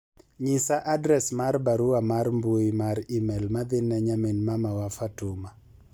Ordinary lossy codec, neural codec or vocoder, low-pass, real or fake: none; none; none; real